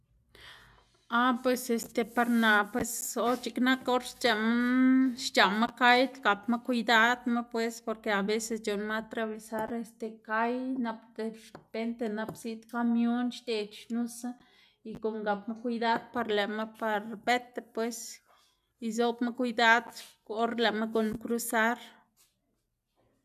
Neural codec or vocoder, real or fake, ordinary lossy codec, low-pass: none; real; none; 14.4 kHz